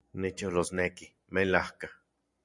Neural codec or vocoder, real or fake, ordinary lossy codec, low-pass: none; real; MP3, 96 kbps; 10.8 kHz